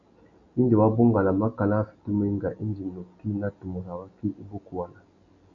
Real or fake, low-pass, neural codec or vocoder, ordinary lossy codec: real; 7.2 kHz; none; AAC, 64 kbps